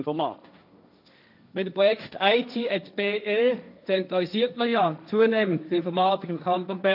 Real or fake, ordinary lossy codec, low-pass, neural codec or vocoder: fake; none; 5.4 kHz; codec, 16 kHz, 1.1 kbps, Voila-Tokenizer